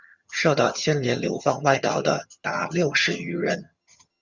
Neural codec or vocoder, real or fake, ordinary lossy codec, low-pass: vocoder, 22.05 kHz, 80 mel bands, HiFi-GAN; fake; Opus, 64 kbps; 7.2 kHz